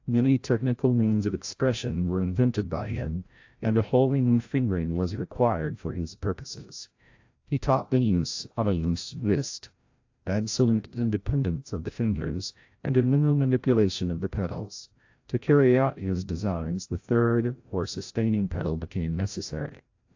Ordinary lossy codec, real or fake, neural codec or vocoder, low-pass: AAC, 48 kbps; fake; codec, 16 kHz, 0.5 kbps, FreqCodec, larger model; 7.2 kHz